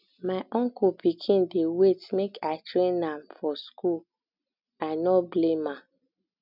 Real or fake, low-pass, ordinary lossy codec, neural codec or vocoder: real; 5.4 kHz; none; none